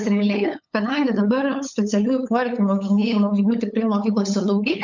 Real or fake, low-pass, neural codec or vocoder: fake; 7.2 kHz; codec, 16 kHz, 8 kbps, FunCodec, trained on LibriTTS, 25 frames a second